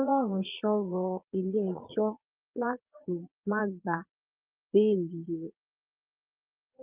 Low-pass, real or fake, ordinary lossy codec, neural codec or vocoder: 3.6 kHz; fake; Opus, 24 kbps; vocoder, 22.05 kHz, 80 mel bands, Vocos